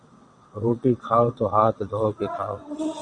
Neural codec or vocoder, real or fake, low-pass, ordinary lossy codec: vocoder, 22.05 kHz, 80 mel bands, WaveNeXt; fake; 9.9 kHz; Opus, 64 kbps